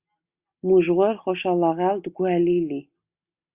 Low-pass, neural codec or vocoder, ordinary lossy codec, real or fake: 3.6 kHz; none; Opus, 64 kbps; real